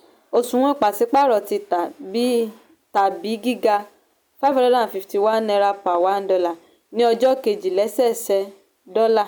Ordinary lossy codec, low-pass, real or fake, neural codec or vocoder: none; none; real; none